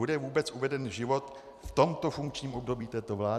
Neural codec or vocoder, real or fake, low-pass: vocoder, 44.1 kHz, 128 mel bands every 256 samples, BigVGAN v2; fake; 14.4 kHz